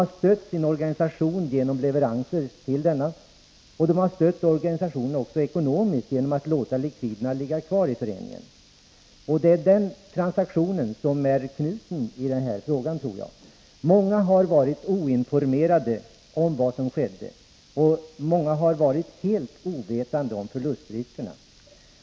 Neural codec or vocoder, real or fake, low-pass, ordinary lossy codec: none; real; none; none